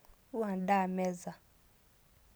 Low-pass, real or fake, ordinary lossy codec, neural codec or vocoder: none; real; none; none